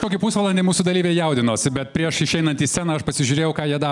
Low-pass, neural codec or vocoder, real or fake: 10.8 kHz; none; real